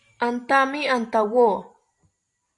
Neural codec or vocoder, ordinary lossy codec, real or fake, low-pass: vocoder, 44.1 kHz, 128 mel bands every 512 samples, BigVGAN v2; MP3, 48 kbps; fake; 10.8 kHz